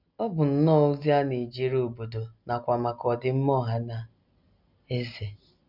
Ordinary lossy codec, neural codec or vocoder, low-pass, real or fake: none; none; 5.4 kHz; real